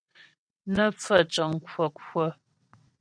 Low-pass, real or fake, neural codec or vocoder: 9.9 kHz; fake; vocoder, 22.05 kHz, 80 mel bands, WaveNeXt